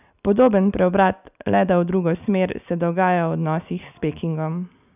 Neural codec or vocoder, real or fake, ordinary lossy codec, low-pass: none; real; none; 3.6 kHz